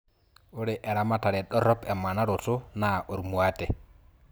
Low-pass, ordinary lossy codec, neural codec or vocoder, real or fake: none; none; none; real